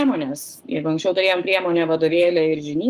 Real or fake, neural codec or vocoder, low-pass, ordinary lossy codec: fake; codec, 44.1 kHz, 7.8 kbps, Pupu-Codec; 14.4 kHz; Opus, 24 kbps